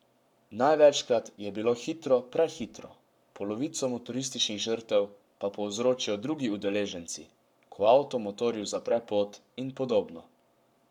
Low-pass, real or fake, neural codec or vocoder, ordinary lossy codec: 19.8 kHz; fake; codec, 44.1 kHz, 7.8 kbps, Pupu-Codec; none